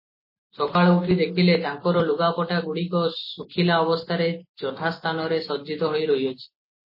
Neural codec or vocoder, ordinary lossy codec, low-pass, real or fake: none; MP3, 24 kbps; 5.4 kHz; real